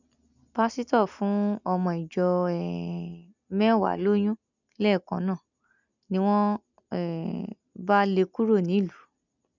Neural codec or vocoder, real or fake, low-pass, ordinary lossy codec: none; real; 7.2 kHz; none